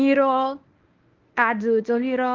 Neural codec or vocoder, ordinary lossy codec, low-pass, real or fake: codec, 24 kHz, 0.9 kbps, WavTokenizer, medium speech release version 1; Opus, 24 kbps; 7.2 kHz; fake